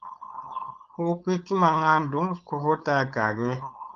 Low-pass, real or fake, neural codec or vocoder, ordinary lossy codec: 7.2 kHz; fake; codec, 16 kHz, 4.8 kbps, FACodec; Opus, 32 kbps